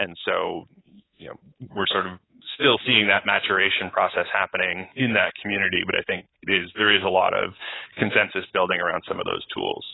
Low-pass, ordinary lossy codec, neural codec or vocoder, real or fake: 7.2 kHz; AAC, 16 kbps; codec, 24 kHz, 3.1 kbps, DualCodec; fake